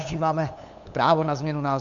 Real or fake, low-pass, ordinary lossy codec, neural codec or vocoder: fake; 7.2 kHz; MP3, 64 kbps; codec, 16 kHz, 8 kbps, FunCodec, trained on LibriTTS, 25 frames a second